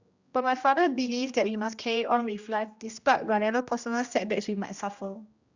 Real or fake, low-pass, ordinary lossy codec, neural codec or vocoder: fake; 7.2 kHz; Opus, 64 kbps; codec, 16 kHz, 1 kbps, X-Codec, HuBERT features, trained on general audio